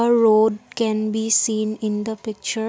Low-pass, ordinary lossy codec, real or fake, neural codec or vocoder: none; none; real; none